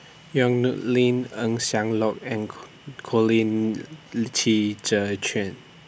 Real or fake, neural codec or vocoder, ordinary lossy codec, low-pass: real; none; none; none